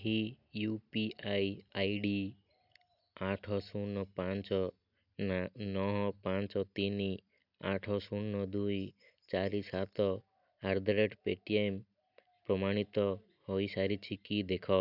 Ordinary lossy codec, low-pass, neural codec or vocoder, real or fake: none; 5.4 kHz; none; real